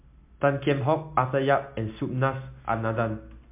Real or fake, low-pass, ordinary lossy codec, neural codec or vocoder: real; 3.6 kHz; MP3, 32 kbps; none